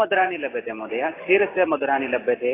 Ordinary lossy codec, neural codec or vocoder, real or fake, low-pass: AAC, 16 kbps; none; real; 3.6 kHz